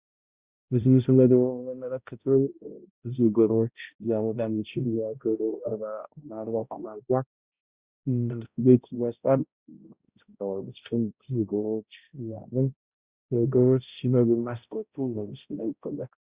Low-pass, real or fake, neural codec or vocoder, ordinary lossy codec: 3.6 kHz; fake; codec, 16 kHz, 0.5 kbps, X-Codec, HuBERT features, trained on balanced general audio; Opus, 64 kbps